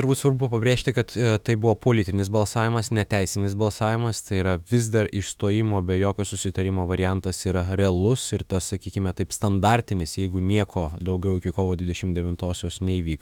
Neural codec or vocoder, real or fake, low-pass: autoencoder, 48 kHz, 32 numbers a frame, DAC-VAE, trained on Japanese speech; fake; 19.8 kHz